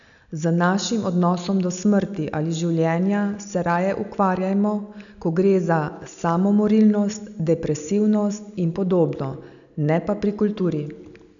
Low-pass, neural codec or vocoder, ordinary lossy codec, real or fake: 7.2 kHz; none; none; real